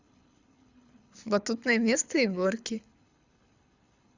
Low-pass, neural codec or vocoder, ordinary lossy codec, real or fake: 7.2 kHz; codec, 24 kHz, 6 kbps, HILCodec; Opus, 64 kbps; fake